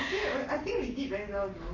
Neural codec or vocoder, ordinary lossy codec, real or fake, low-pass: codec, 44.1 kHz, 7.8 kbps, Pupu-Codec; none; fake; 7.2 kHz